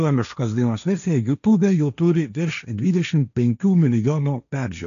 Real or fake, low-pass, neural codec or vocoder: fake; 7.2 kHz; codec, 16 kHz, 1.1 kbps, Voila-Tokenizer